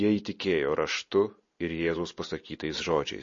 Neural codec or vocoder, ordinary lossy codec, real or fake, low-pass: none; MP3, 32 kbps; real; 7.2 kHz